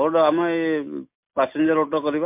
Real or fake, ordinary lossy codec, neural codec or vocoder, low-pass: real; MP3, 32 kbps; none; 3.6 kHz